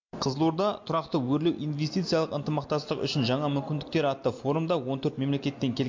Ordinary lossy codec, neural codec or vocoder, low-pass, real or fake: MP3, 48 kbps; none; 7.2 kHz; real